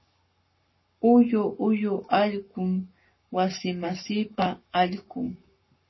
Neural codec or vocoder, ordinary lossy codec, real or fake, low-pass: codec, 44.1 kHz, 7.8 kbps, Pupu-Codec; MP3, 24 kbps; fake; 7.2 kHz